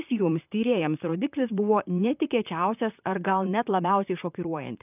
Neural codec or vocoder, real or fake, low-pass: vocoder, 44.1 kHz, 128 mel bands, Pupu-Vocoder; fake; 3.6 kHz